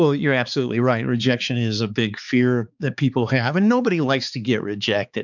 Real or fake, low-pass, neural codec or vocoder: fake; 7.2 kHz; codec, 16 kHz, 4 kbps, X-Codec, HuBERT features, trained on balanced general audio